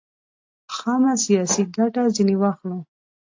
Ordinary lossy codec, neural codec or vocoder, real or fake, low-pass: AAC, 48 kbps; none; real; 7.2 kHz